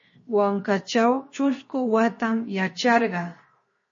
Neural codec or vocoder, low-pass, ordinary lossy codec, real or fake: codec, 16 kHz, 0.7 kbps, FocalCodec; 7.2 kHz; MP3, 32 kbps; fake